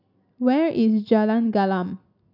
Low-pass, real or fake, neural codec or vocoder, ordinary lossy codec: 5.4 kHz; real; none; none